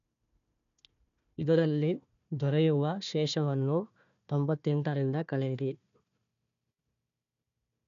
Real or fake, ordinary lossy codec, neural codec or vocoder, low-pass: fake; MP3, 96 kbps; codec, 16 kHz, 1 kbps, FunCodec, trained on Chinese and English, 50 frames a second; 7.2 kHz